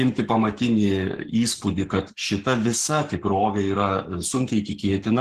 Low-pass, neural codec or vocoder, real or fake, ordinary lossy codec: 14.4 kHz; codec, 44.1 kHz, 7.8 kbps, Pupu-Codec; fake; Opus, 16 kbps